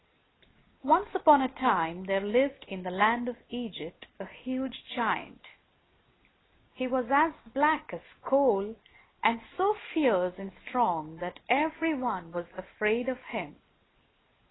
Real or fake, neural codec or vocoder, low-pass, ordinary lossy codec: real; none; 7.2 kHz; AAC, 16 kbps